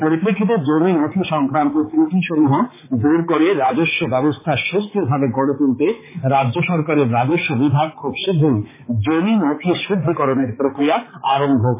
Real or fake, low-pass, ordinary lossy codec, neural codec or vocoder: fake; 3.6 kHz; MP3, 16 kbps; codec, 16 kHz, 4 kbps, X-Codec, HuBERT features, trained on balanced general audio